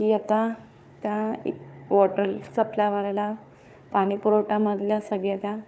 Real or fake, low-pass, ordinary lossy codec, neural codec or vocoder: fake; none; none; codec, 16 kHz, 4 kbps, FunCodec, trained on Chinese and English, 50 frames a second